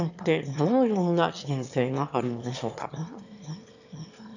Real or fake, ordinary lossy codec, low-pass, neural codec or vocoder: fake; none; 7.2 kHz; autoencoder, 22.05 kHz, a latent of 192 numbers a frame, VITS, trained on one speaker